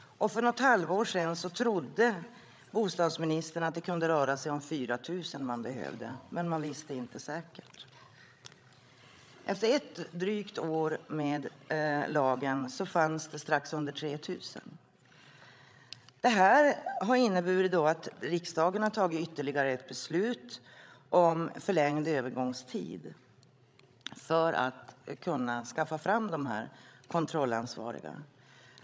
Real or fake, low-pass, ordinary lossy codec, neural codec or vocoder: fake; none; none; codec, 16 kHz, 16 kbps, FreqCodec, larger model